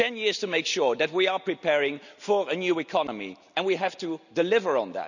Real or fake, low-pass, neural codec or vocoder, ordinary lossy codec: real; 7.2 kHz; none; none